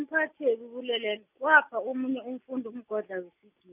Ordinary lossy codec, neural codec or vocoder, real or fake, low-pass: none; none; real; 3.6 kHz